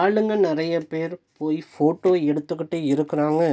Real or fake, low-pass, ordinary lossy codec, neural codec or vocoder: real; none; none; none